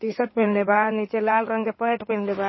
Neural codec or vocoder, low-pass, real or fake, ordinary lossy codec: codec, 16 kHz in and 24 kHz out, 2.2 kbps, FireRedTTS-2 codec; 7.2 kHz; fake; MP3, 24 kbps